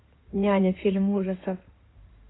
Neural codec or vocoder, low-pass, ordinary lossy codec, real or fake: codec, 16 kHz in and 24 kHz out, 2.2 kbps, FireRedTTS-2 codec; 7.2 kHz; AAC, 16 kbps; fake